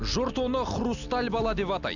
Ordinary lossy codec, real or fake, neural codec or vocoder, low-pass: none; real; none; 7.2 kHz